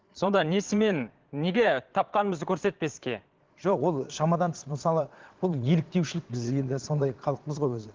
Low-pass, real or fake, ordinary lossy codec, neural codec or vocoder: 7.2 kHz; fake; Opus, 16 kbps; vocoder, 22.05 kHz, 80 mel bands, Vocos